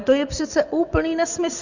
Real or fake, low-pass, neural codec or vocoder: fake; 7.2 kHz; vocoder, 24 kHz, 100 mel bands, Vocos